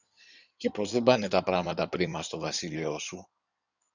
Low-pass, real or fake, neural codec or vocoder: 7.2 kHz; fake; codec, 16 kHz in and 24 kHz out, 2.2 kbps, FireRedTTS-2 codec